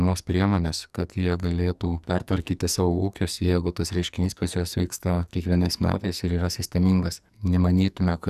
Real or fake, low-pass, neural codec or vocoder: fake; 14.4 kHz; codec, 44.1 kHz, 2.6 kbps, SNAC